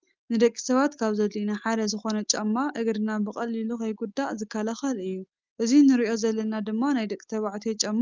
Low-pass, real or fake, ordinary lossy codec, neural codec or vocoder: 7.2 kHz; real; Opus, 32 kbps; none